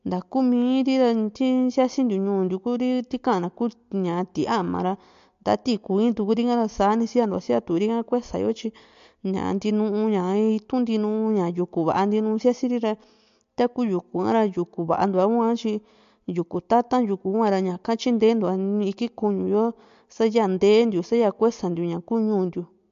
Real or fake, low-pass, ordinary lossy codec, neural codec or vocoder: real; 7.2 kHz; MP3, 48 kbps; none